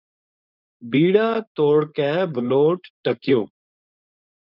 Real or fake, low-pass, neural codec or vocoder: fake; 5.4 kHz; codec, 16 kHz, 4.8 kbps, FACodec